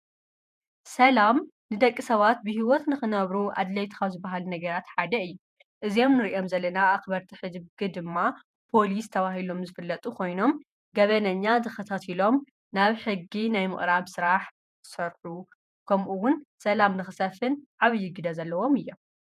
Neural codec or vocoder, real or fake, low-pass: vocoder, 48 kHz, 128 mel bands, Vocos; fake; 14.4 kHz